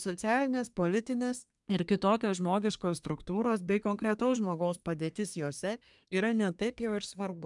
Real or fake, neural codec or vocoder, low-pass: fake; codec, 24 kHz, 1 kbps, SNAC; 10.8 kHz